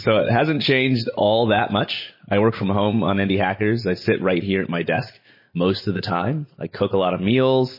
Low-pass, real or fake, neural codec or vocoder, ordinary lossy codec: 5.4 kHz; real; none; MP3, 24 kbps